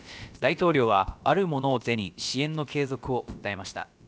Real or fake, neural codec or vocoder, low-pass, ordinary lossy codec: fake; codec, 16 kHz, about 1 kbps, DyCAST, with the encoder's durations; none; none